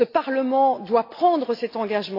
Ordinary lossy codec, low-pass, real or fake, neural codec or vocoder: AAC, 32 kbps; 5.4 kHz; real; none